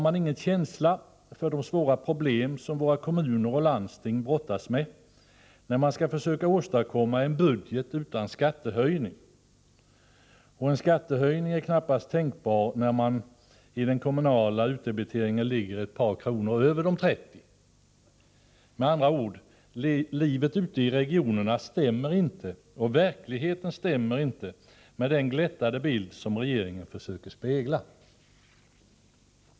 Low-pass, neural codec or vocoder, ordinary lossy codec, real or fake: none; none; none; real